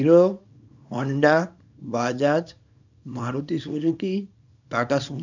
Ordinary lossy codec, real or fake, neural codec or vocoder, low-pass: none; fake; codec, 24 kHz, 0.9 kbps, WavTokenizer, small release; 7.2 kHz